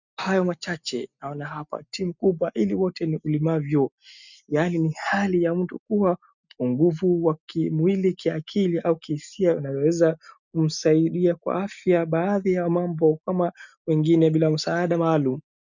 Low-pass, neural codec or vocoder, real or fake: 7.2 kHz; none; real